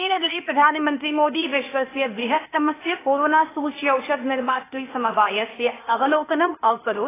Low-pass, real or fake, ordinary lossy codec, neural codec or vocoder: 3.6 kHz; fake; AAC, 16 kbps; codec, 16 kHz, 0.8 kbps, ZipCodec